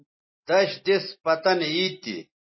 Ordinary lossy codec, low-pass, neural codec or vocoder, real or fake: MP3, 24 kbps; 7.2 kHz; none; real